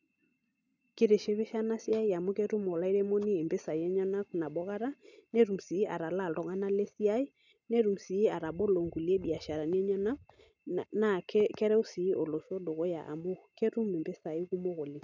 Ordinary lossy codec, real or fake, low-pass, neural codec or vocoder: none; real; 7.2 kHz; none